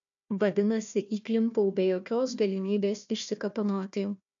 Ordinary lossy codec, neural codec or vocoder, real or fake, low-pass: MP3, 64 kbps; codec, 16 kHz, 1 kbps, FunCodec, trained on Chinese and English, 50 frames a second; fake; 7.2 kHz